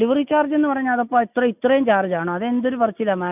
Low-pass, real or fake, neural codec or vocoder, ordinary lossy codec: 3.6 kHz; real; none; none